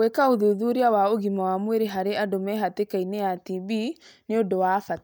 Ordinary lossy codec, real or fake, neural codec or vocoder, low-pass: none; real; none; none